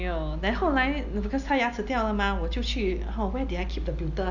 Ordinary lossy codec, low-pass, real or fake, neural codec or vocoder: none; 7.2 kHz; real; none